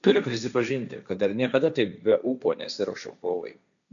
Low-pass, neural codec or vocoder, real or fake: 7.2 kHz; codec, 16 kHz, 1.1 kbps, Voila-Tokenizer; fake